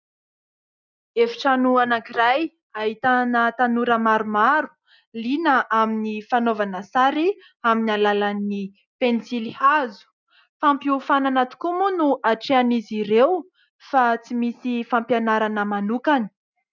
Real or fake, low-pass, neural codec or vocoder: real; 7.2 kHz; none